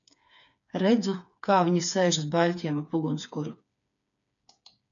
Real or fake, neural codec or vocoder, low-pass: fake; codec, 16 kHz, 4 kbps, FreqCodec, smaller model; 7.2 kHz